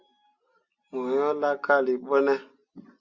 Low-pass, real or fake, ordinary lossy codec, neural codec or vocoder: 7.2 kHz; real; Opus, 64 kbps; none